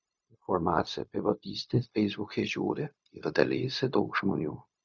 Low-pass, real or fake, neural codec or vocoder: 7.2 kHz; fake; codec, 16 kHz, 0.4 kbps, LongCat-Audio-Codec